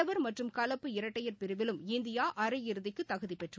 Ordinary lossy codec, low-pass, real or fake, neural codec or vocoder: none; none; real; none